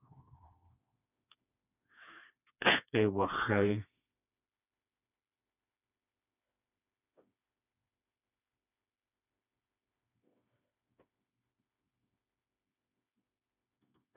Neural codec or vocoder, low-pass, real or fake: codec, 16 kHz, 2 kbps, FreqCodec, smaller model; 3.6 kHz; fake